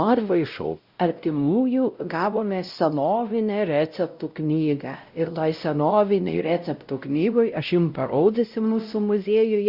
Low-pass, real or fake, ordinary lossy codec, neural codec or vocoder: 5.4 kHz; fake; Opus, 64 kbps; codec, 16 kHz, 0.5 kbps, X-Codec, WavLM features, trained on Multilingual LibriSpeech